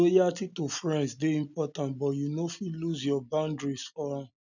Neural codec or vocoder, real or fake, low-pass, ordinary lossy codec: none; real; 7.2 kHz; none